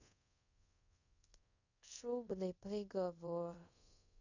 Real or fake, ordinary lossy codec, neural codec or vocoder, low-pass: fake; none; codec, 24 kHz, 0.5 kbps, DualCodec; 7.2 kHz